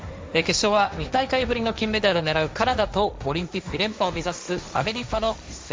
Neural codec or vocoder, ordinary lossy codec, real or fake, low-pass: codec, 16 kHz, 1.1 kbps, Voila-Tokenizer; none; fake; none